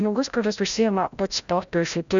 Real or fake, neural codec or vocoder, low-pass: fake; codec, 16 kHz, 0.5 kbps, FreqCodec, larger model; 7.2 kHz